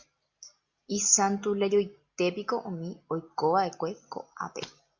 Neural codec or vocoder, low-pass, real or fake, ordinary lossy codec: none; 7.2 kHz; real; Opus, 64 kbps